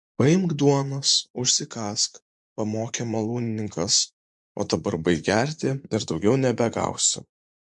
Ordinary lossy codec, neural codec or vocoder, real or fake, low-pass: MP3, 64 kbps; vocoder, 24 kHz, 100 mel bands, Vocos; fake; 10.8 kHz